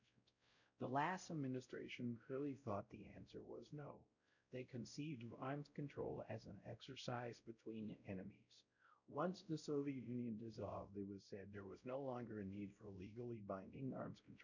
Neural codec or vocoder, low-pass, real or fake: codec, 16 kHz, 0.5 kbps, X-Codec, WavLM features, trained on Multilingual LibriSpeech; 7.2 kHz; fake